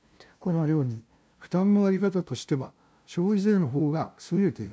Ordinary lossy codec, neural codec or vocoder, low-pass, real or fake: none; codec, 16 kHz, 0.5 kbps, FunCodec, trained on LibriTTS, 25 frames a second; none; fake